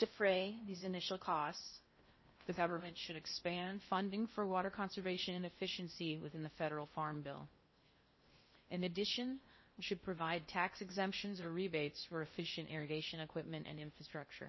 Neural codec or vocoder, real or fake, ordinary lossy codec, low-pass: codec, 16 kHz in and 24 kHz out, 0.6 kbps, FocalCodec, streaming, 4096 codes; fake; MP3, 24 kbps; 7.2 kHz